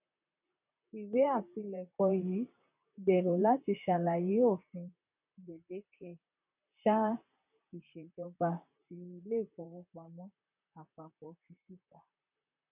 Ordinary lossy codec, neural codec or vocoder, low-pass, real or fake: none; vocoder, 44.1 kHz, 128 mel bands, Pupu-Vocoder; 3.6 kHz; fake